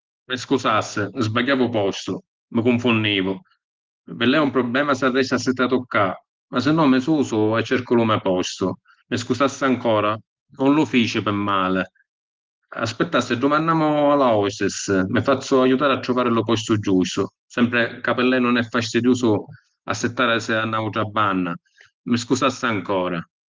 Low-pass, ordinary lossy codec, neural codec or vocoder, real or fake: 7.2 kHz; Opus, 16 kbps; none; real